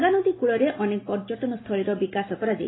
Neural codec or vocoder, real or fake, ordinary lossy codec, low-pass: none; real; AAC, 16 kbps; 7.2 kHz